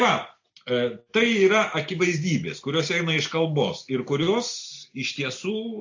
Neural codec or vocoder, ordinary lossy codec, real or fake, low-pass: none; AAC, 48 kbps; real; 7.2 kHz